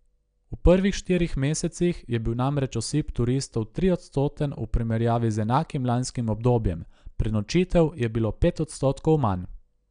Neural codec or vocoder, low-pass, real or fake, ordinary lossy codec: none; 9.9 kHz; real; none